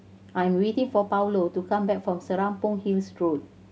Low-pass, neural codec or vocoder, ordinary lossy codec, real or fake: none; none; none; real